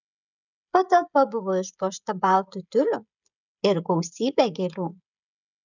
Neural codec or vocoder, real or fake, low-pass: codec, 16 kHz, 16 kbps, FreqCodec, smaller model; fake; 7.2 kHz